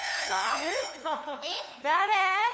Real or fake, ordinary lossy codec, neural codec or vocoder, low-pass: fake; none; codec, 16 kHz, 2 kbps, FunCodec, trained on LibriTTS, 25 frames a second; none